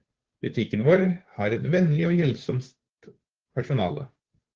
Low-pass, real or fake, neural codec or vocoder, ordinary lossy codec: 7.2 kHz; fake; codec, 16 kHz, 2 kbps, FunCodec, trained on Chinese and English, 25 frames a second; Opus, 16 kbps